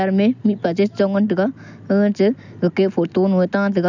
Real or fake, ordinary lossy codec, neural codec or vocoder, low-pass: real; none; none; 7.2 kHz